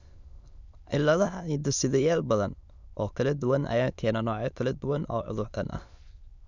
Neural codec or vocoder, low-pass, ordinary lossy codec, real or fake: autoencoder, 22.05 kHz, a latent of 192 numbers a frame, VITS, trained on many speakers; 7.2 kHz; none; fake